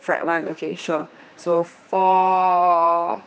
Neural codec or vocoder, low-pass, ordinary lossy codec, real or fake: codec, 16 kHz, 2 kbps, X-Codec, HuBERT features, trained on general audio; none; none; fake